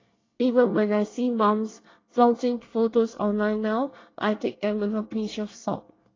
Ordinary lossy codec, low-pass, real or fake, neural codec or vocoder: AAC, 32 kbps; 7.2 kHz; fake; codec, 24 kHz, 1 kbps, SNAC